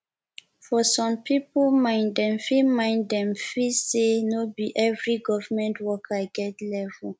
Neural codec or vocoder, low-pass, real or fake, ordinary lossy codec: none; none; real; none